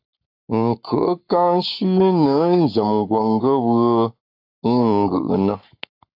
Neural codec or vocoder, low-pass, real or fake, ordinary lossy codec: codec, 44.1 kHz, 7.8 kbps, Pupu-Codec; 5.4 kHz; fake; AAC, 48 kbps